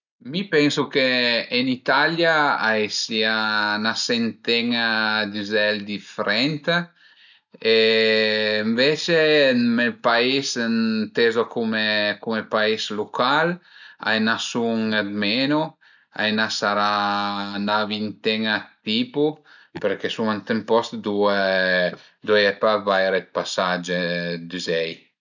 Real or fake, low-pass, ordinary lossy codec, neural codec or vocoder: real; 7.2 kHz; none; none